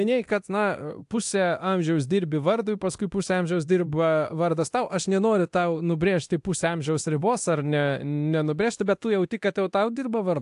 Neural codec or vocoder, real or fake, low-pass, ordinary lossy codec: codec, 24 kHz, 0.9 kbps, DualCodec; fake; 10.8 kHz; AAC, 64 kbps